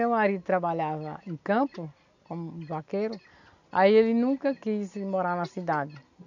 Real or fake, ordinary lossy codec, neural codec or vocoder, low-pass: real; none; none; 7.2 kHz